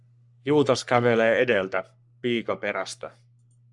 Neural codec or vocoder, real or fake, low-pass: codec, 44.1 kHz, 3.4 kbps, Pupu-Codec; fake; 10.8 kHz